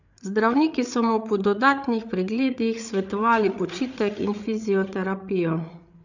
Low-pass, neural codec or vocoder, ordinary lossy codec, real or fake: 7.2 kHz; codec, 16 kHz, 8 kbps, FreqCodec, larger model; none; fake